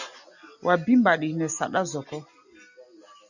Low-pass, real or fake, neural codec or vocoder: 7.2 kHz; real; none